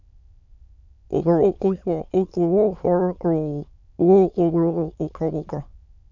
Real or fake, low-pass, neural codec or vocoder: fake; 7.2 kHz; autoencoder, 22.05 kHz, a latent of 192 numbers a frame, VITS, trained on many speakers